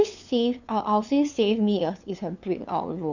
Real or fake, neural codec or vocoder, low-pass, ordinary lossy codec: fake; codec, 16 kHz, 2 kbps, FunCodec, trained on LibriTTS, 25 frames a second; 7.2 kHz; none